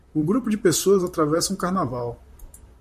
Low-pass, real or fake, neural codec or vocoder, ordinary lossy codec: 14.4 kHz; fake; vocoder, 44.1 kHz, 128 mel bands, Pupu-Vocoder; MP3, 64 kbps